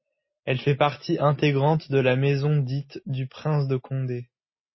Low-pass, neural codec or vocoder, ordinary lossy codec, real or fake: 7.2 kHz; none; MP3, 24 kbps; real